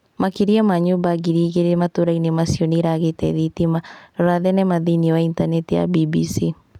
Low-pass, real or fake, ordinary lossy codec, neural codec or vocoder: 19.8 kHz; real; none; none